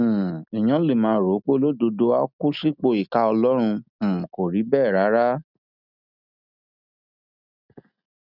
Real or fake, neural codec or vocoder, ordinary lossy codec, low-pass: real; none; none; 5.4 kHz